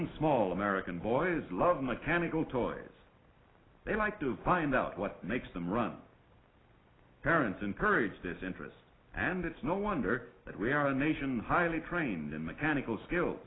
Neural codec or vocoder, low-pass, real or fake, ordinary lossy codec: none; 7.2 kHz; real; AAC, 16 kbps